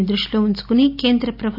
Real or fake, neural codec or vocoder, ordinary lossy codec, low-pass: real; none; none; 5.4 kHz